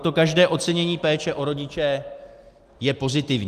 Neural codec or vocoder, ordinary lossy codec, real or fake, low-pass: none; Opus, 32 kbps; real; 14.4 kHz